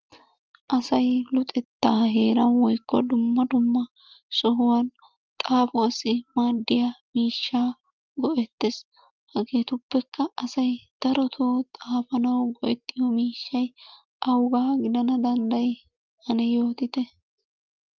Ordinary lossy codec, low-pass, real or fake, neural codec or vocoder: Opus, 24 kbps; 7.2 kHz; real; none